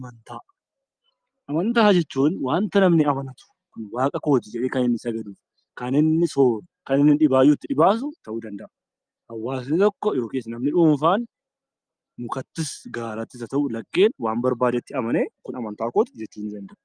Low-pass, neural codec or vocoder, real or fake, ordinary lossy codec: 9.9 kHz; none; real; Opus, 32 kbps